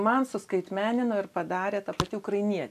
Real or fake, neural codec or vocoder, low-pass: real; none; 14.4 kHz